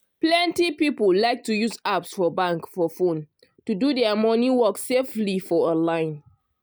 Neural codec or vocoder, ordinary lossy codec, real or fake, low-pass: none; none; real; none